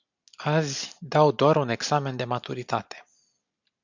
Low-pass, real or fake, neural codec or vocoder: 7.2 kHz; real; none